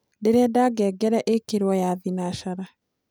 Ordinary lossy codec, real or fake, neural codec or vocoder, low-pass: none; real; none; none